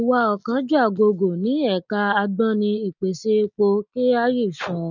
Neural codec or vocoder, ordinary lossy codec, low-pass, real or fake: none; none; 7.2 kHz; real